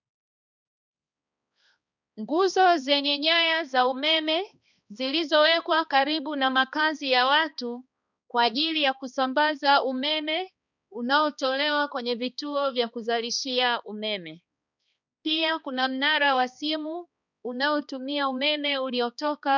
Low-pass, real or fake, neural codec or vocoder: 7.2 kHz; fake; codec, 16 kHz, 2 kbps, X-Codec, HuBERT features, trained on balanced general audio